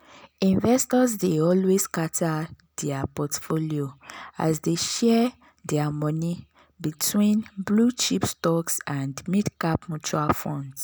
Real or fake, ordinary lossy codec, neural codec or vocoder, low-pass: real; none; none; none